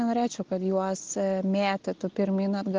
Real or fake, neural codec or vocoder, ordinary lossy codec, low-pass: real; none; Opus, 16 kbps; 7.2 kHz